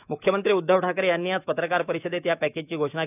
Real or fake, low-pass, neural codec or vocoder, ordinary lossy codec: fake; 3.6 kHz; autoencoder, 48 kHz, 128 numbers a frame, DAC-VAE, trained on Japanese speech; none